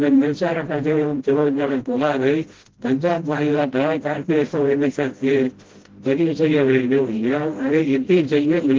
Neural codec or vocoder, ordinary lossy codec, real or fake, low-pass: codec, 16 kHz, 0.5 kbps, FreqCodec, smaller model; Opus, 16 kbps; fake; 7.2 kHz